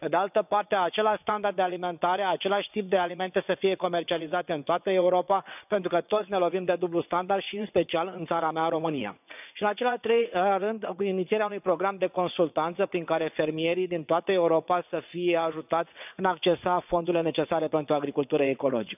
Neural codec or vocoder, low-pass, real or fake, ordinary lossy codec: vocoder, 22.05 kHz, 80 mel bands, WaveNeXt; 3.6 kHz; fake; none